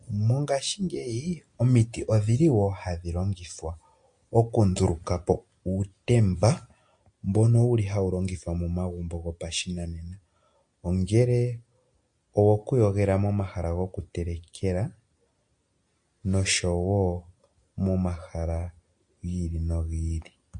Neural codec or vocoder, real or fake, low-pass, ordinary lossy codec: none; real; 9.9 kHz; MP3, 48 kbps